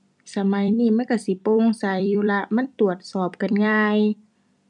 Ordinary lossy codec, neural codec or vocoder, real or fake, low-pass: none; vocoder, 44.1 kHz, 128 mel bands every 256 samples, BigVGAN v2; fake; 10.8 kHz